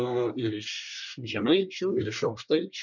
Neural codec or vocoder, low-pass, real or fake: codec, 24 kHz, 1 kbps, SNAC; 7.2 kHz; fake